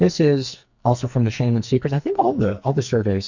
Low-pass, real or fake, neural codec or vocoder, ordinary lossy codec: 7.2 kHz; fake; codec, 44.1 kHz, 2.6 kbps, SNAC; Opus, 64 kbps